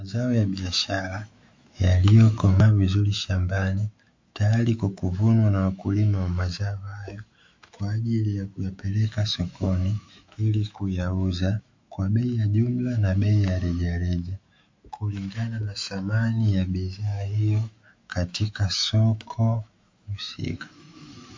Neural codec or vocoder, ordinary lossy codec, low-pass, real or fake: autoencoder, 48 kHz, 128 numbers a frame, DAC-VAE, trained on Japanese speech; MP3, 48 kbps; 7.2 kHz; fake